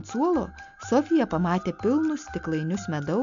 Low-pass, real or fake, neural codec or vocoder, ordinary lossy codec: 7.2 kHz; real; none; MP3, 64 kbps